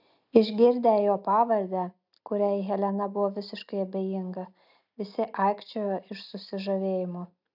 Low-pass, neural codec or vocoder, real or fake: 5.4 kHz; none; real